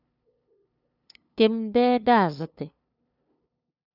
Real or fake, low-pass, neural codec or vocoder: fake; 5.4 kHz; codec, 16 kHz, 2 kbps, FunCodec, trained on LibriTTS, 25 frames a second